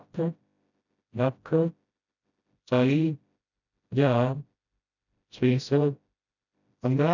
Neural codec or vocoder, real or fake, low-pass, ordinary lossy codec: codec, 16 kHz, 0.5 kbps, FreqCodec, smaller model; fake; 7.2 kHz; none